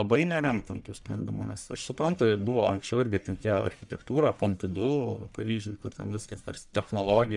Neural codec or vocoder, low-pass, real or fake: codec, 44.1 kHz, 1.7 kbps, Pupu-Codec; 10.8 kHz; fake